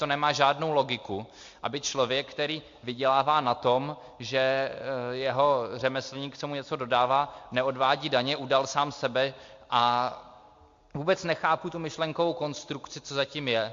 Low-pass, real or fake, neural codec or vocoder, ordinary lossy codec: 7.2 kHz; real; none; MP3, 48 kbps